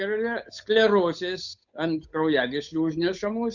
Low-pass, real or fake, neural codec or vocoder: 7.2 kHz; fake; codec, 16 kHz, 8 kbps, FunCodec, trained on Chinese and English, 25 frames a second